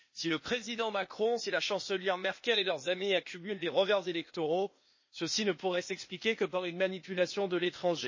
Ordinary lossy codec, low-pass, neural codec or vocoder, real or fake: MP3, 32 kbps; 7.2 kHz; codec, 16 kHz, 0.8 kbps, ZipCodec; fake